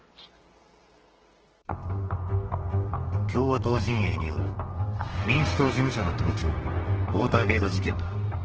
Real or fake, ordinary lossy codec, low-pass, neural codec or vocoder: fake; Opus, 16 kbps; 7.2 kHz; codec, 32 kHz, 1.9 kbps, SNAC